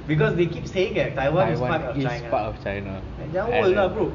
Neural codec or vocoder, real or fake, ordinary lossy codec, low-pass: none; real; none; 7.2 kHz